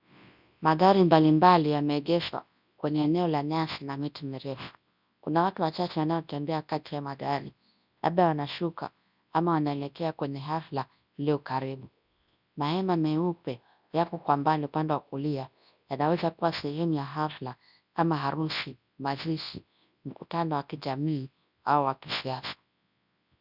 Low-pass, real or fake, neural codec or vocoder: 5.4 kHz; fake; codec, 24 kHz, 0.9 kbps, WavTokenizer, large speech release